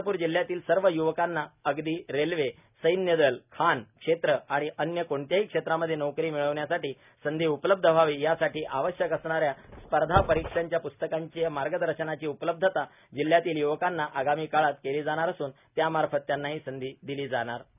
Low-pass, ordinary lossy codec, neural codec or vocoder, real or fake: 3.6 kHz; none; none; real